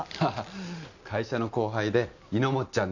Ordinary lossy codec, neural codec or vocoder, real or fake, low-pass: none; none; real; 7.2 kHz